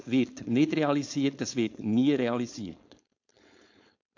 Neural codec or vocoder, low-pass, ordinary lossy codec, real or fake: codec, 16 kHz, 4.8 kbps, FACodec; 7.2 kHz; AAC, 48 kbps; fake